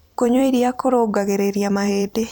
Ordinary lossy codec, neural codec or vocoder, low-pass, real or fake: none; vocoder, 44.1 kHz, 128 mel bands every 256 samples, BigVGAN v2; none; fake